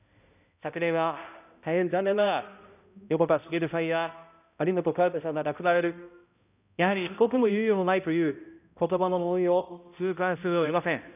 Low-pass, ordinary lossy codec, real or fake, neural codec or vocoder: 3.6 kHz; none; fake; codec, 16 kHz, 0.5 kbps, X-Codec, HuBERT features, trained on balanced general audio